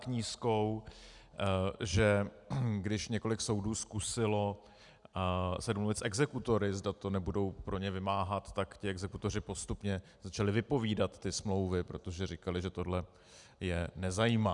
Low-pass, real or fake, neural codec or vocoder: 10.8 kHz; real; none